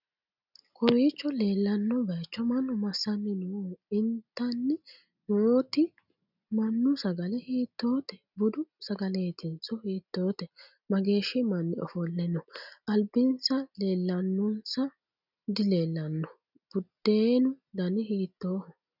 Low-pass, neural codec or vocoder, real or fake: 5.4 kHz; none; real